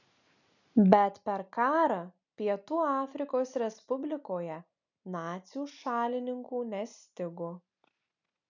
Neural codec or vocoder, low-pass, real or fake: none; 7.2 kHz; real